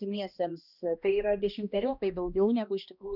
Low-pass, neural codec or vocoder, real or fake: 5.4 kHz; codec, 16 kHz, 1 kbps, X-Codec, HuBERT features, trained on balanced general audio; fake